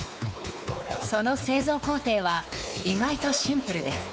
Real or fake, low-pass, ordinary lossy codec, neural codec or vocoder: fake; none; none; codec, 16 kHz, 4 kbps, X-Codec, WavLM features, trained on Multilingual LibriSpeech